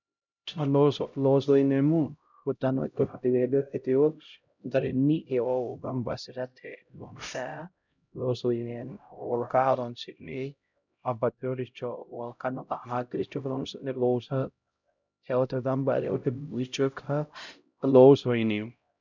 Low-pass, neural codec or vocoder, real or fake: 7.2 kHz; codec, 16 kHz, 0.5 kbps, X-Codec, HuBERT features, trained on LibriSpeech; fake